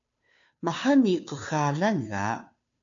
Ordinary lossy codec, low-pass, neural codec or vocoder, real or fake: AAC, 64 kbps; 7.2 kHz; codec, 16 kHz, 2 kbps, FunCodec, trained on Chinese and English, 25 frames a second; fake